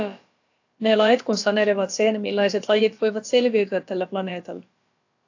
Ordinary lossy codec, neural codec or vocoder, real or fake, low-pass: AAC, 48 kbps; codec, 16 kHz, about 1 kbps, DyCAST, with the encoder's durations; fake; 7.2 kHz